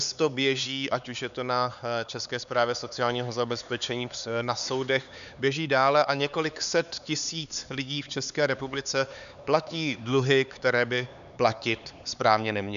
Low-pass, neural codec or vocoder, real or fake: 7.2 kHz; codec, 16 kHz, 4 kbps, X-Codec, HuBERT features, trained on LibriSpeech; fake